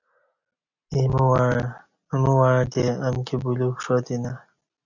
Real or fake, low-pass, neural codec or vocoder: real; 7.2 kHz; none